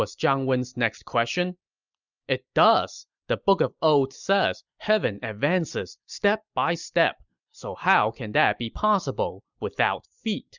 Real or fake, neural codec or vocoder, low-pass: real; none; 7.2 kHz